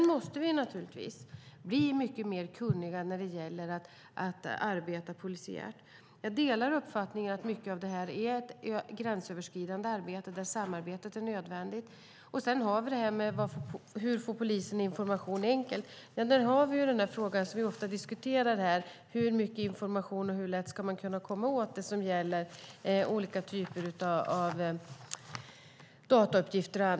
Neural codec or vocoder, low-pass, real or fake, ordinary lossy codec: none; none; real; none